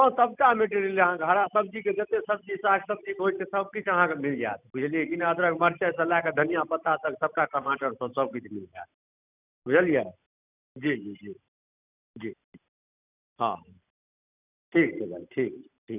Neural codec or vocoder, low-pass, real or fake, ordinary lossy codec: none; 3.6 kHz; real; none